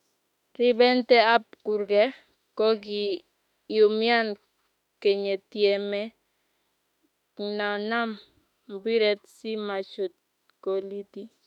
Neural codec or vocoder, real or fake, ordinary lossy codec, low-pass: autoencoder, 48 kHz, 32 numbers a frame, DAC-VAE, trained on Japanese speech; fake; none; 19.8 kHz